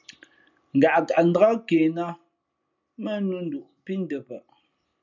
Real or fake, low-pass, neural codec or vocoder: real; 7.2 kHz; none